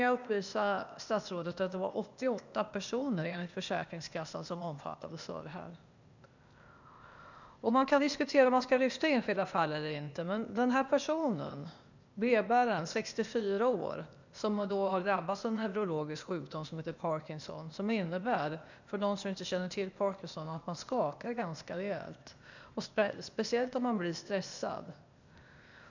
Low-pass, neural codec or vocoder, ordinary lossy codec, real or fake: 7.2 kHz; codec, 16 kHz, 0.8 kbps, ZipCodec; none; fake